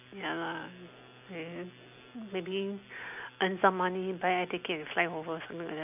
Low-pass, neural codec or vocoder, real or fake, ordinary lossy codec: 3.6 kHz; none; real; none